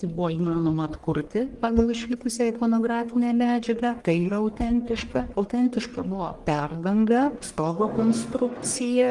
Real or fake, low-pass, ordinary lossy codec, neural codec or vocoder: fake; 10.8 kHz; Opus, 24 kbps; codec, 44.1 kHz, 1.7 kbps, Pupu-Codec